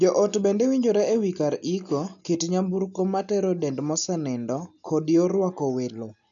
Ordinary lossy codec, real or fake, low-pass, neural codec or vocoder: none; real; 7.2 kHz; none